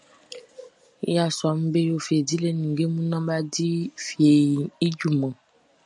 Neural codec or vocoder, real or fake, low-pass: none; real; 10.8 kHz